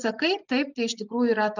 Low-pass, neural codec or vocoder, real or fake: 7.2 kHz; none; real